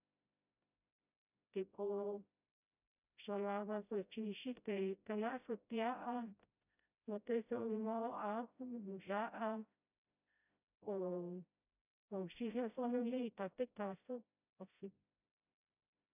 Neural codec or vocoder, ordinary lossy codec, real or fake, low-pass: codec, 16 kHz, 0.5 kbps, FreqCodec, smaller model; none; fake; 3.6 kHz